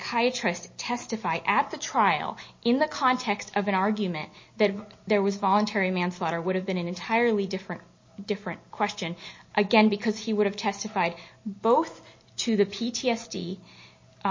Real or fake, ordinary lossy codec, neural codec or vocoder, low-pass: real; MP3, 32 kbps; none; 7.2 kHz